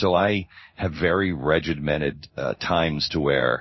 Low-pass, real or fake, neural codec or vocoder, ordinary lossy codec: 7.2 kHz; fake; codec, 16 kHz in and 24 kHz out, 1 kbps, XY-Tokenizer; MP3, 24 kbps